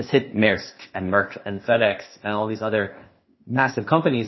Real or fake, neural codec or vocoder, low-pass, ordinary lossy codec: fake; codec, 16 kHz, 0.8 kbps, ZipCodec; 7.2 kHz; MP3, 24 kbps